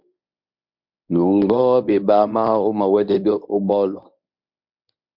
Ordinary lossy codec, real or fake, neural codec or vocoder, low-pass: MP3, 48 kbps; fake; codec, 24 kHz, 0.9 kbps, WavTokenizer, medium speech release version 1; 5.4 kHz